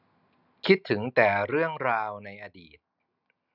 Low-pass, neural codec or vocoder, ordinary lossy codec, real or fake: 5.4 kHz; none; none; real